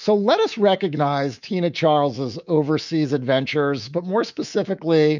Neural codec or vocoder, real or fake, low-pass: codec, 24 kHz, 3.1 kbps, DualCodec; fake; 7.2 kHz